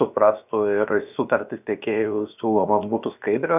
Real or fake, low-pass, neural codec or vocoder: fake; 3.6 kHz; codec, 16 kHz, about 1 kbps, DyCAST, with the encoder's durations